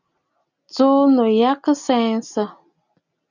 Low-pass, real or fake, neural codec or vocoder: 7.2 kHz; real; none